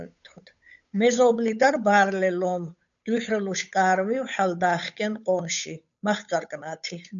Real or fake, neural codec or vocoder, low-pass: fake; codec, 16 kHz, 8 kbps, FunCodec, trained on Chinese and English, 25 frames a second; 7.2 kHz